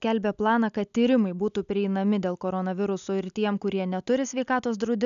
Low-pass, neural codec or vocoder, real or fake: 7.2 kHz; none; real